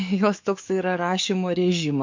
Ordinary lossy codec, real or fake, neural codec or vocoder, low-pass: MP3, 48 kbps; fake; codec, 44.1 kHz, 7.8 kbps, DAC; 7.2 kHz